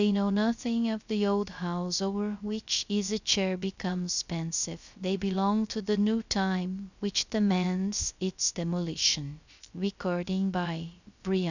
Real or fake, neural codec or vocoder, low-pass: fake; codec, 16 kHz, 0.3 kbps, FocalCodec; 7.2 kHz